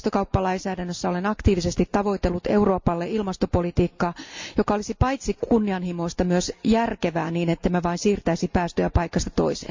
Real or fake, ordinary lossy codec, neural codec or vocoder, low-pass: real; MP3, 64 kbps; none; 7.2 kHz